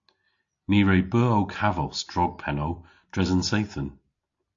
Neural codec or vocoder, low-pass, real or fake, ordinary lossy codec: none; 7.2 kHz; real; AAC, 48 kbps